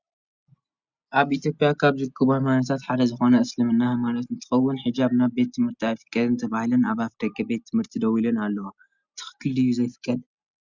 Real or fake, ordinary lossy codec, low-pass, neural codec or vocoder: real; Opus, 64 kbps; 7.2 kHz; none